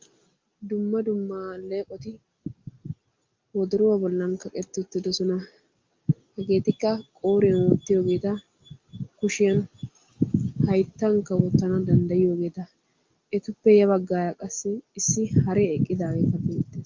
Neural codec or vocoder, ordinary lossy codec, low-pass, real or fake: none; Opus, 24 kbps; 7.2 kHz; real